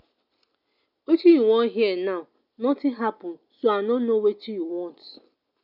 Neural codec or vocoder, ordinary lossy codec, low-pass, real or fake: none; none; 5.4 kHz; real